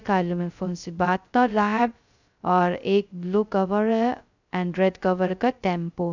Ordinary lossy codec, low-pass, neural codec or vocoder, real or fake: none; 7.2 kHz; codec, 16 kHz, 0.2 kbps, FocalCodec; fake